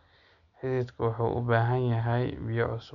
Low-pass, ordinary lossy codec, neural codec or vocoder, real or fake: 7.2 kHz; none; none; real